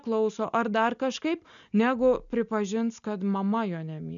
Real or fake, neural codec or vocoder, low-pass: real; none; 7.2 kHz